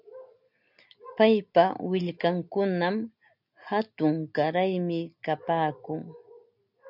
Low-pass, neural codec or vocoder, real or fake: 5.4 kHz; none; real